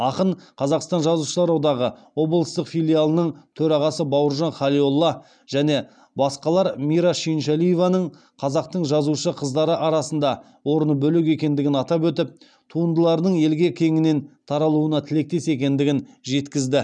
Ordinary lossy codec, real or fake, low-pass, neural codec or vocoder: none; real; 9.9 kHz; none